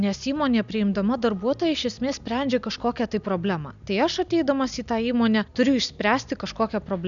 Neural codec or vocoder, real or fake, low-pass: none; real; 7.2 kHz